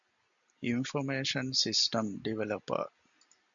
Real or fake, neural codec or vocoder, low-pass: real; none; 7.2 kHz